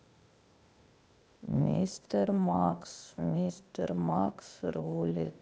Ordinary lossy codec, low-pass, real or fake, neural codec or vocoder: none; none; fake; codec, 16 kHz, 0.8 kbps, ZipCodec